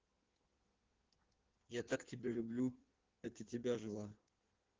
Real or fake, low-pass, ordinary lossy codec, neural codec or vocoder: fake; 7.2 kHz; Opus, 16 kbps; codec, 16 kHz in and 24 kHz out, 1.1 kbps, FireRedTTS-2 codec